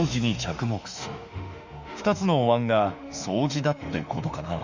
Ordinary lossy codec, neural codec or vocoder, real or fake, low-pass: Opus, 64 kbps; autoencoder, 48 kHz, 32 numbers a frame, DAC-VAE, trained on Japanese speech; fake; 7.2 kHz